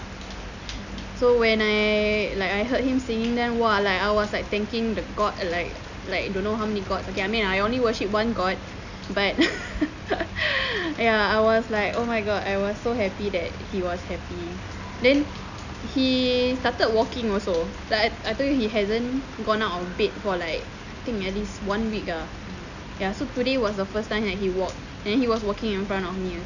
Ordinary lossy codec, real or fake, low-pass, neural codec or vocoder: none; real; 7.2 kHz; none